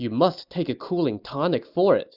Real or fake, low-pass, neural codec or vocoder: real; 5.4 kHz; none